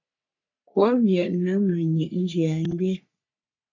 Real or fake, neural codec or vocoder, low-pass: fake; codec, 44.1 kHz, 3.4 kbps, Pupu-Codec; 7.2 kHz